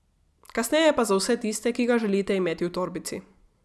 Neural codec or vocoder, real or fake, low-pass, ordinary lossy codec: none; real; none; none